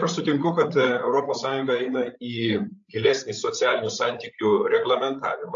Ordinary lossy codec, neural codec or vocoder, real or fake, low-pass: AAC, 64 kbps; codec, 16 kHz, 8 kbps, FreqCodec, larger model; fake; 7.2 kHz